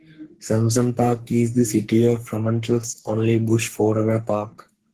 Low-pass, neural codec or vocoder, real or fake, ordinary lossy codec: 14.4 kHz; codec, 44.1 kHz, 3.4 kbps, Pupu-Codec; fake; Opus, 16 kbps